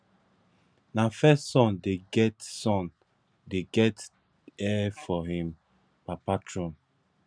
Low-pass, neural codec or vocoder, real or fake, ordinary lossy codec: 9.9 kHz; none; real; none